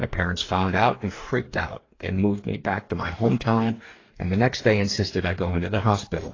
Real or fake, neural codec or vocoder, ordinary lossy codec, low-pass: fake; codec, 44.1 kHz, 2.6 kbps, DAC; AAC, 32 kbps; 7.2 kHz